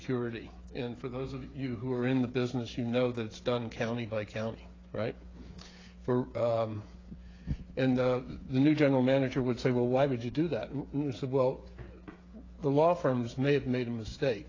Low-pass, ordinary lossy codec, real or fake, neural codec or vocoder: 7.2 kHz; AAC, 32 kbps; fake; codec, 16 kHz, 8 kbps, FreqCodec, smaller model